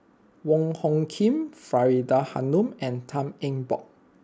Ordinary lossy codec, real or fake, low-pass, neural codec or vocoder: none; real; none; none